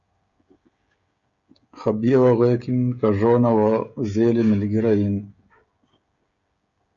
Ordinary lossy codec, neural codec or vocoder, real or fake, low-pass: Opus, 64 kbps; codec, 16 kHz, 16 kbps, FreqCodec, smaller model; fake; 7.2 kHz